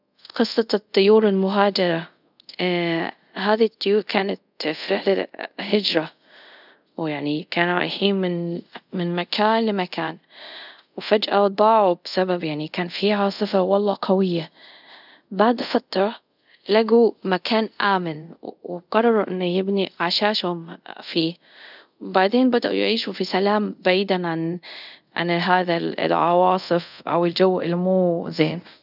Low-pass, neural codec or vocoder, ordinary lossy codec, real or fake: 5.4 kHz; codec, 24 kHz, 0.5 kbps, DualCodec; none; fake